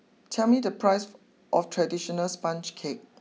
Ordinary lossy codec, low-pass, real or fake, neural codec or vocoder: none; none; real; none